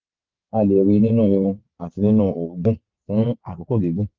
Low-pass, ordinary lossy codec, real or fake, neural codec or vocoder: 7.2 kHz; Opus, 24 kbps; fake; vocoder, 22.05 kHz, 80 mel bands, WaveNeXt